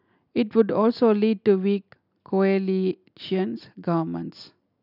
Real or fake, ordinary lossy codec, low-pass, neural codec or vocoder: real; none; 5.4 kHz; none